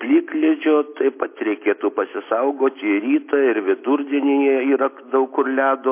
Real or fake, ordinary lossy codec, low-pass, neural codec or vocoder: real; MP3, 24 kbps; 3.6 kHz; none